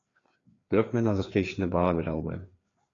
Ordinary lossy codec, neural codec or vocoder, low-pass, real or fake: AAC, 32 kbps; codec, 16 kHz, 2 kbps, FreqCodec, larger model; 7.2 kHz; fake